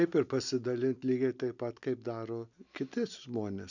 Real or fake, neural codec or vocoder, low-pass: real; none; 7.2 kHz